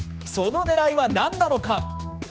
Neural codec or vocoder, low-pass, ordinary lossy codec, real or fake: codec, 16 kHz, 2 kbps, X-Codec, HuBERT features, trained on general audio; none; none; fake